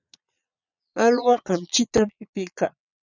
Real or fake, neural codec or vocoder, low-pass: fake; vocoder, 22.05 kHz, 80 mel bands, Vocos; 7.2 kHz